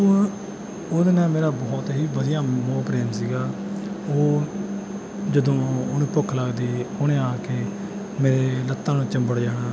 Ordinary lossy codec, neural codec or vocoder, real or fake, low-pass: none; none; real; none